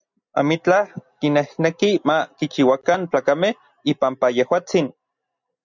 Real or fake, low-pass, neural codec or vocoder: real; 7.2 kHz; none